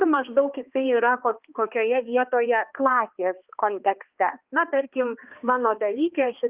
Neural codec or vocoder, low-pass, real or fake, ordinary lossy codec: codec, 16 kHz, 2 kbps, X-Codec, HuBERT features, trained on balanced general audio; 3.6 kHz; fake; Opus, 32 kbps